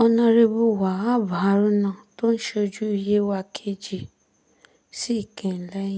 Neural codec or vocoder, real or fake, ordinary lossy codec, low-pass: none; real; none; none